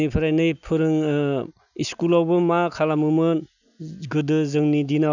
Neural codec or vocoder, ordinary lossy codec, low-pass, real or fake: none; none; 7.2 kHz; real